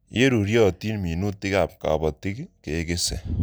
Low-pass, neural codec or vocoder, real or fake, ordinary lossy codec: none; none; real; none